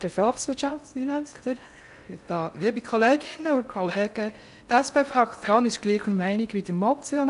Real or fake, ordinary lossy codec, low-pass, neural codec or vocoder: fake; none; 10.8 kHz; codec, 16 kHz in and 24 kHz out, 0.6 kbps, FocalCodec, streaming, 4096 codes